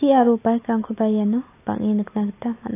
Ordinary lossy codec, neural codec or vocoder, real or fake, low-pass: AAC, 24 kbps; none; real; 3.6 kHz